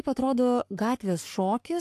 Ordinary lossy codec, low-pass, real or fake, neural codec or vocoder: AAC, 64 kbps; 14.4 kHz; fake; codec, 44.1 kHz, 3.4 kbps, Pupu-Codec